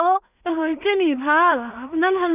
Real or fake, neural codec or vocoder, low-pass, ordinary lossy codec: fake; codec, 16 kHz in and 24 kHz out, 0.4 kbps, LongCat-Audio-Codec, two codebook decoder; 3.6 kHz; none